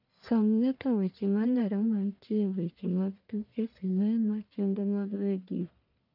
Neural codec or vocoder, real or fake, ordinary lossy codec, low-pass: codec, 44.1 kHz, 1.7 kbps, Pupu-Codec; fake; none; 5.4 kHz